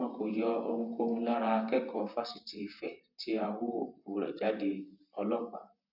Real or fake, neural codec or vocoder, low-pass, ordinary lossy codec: fake; vocoder, 44.1 kHz, 128 mel bands, Pupu-Vocoder; 5.4 kHz; none